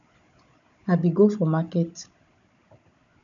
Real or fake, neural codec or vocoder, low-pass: fake; codec, 16 kHz, 16 kbps, FunCodec, trained on Chinese and English, 50 frames a second; 7.2 kHz